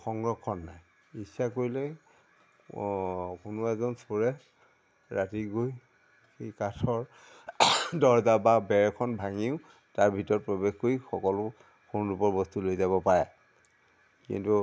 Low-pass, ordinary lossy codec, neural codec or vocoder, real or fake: none; none; none; real